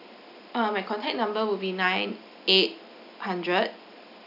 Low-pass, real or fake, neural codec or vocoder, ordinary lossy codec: 5.4 kHz; real; none; none